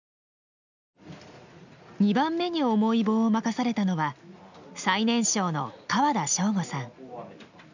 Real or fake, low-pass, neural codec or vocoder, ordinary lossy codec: real; 7.2 kHz; none; none